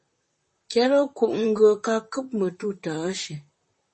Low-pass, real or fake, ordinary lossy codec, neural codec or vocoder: 10.8 kHz; fake; MP3, 32 kbps; vocoder, 44.1 kHz, 128 mel bands, Pupu-Vocoder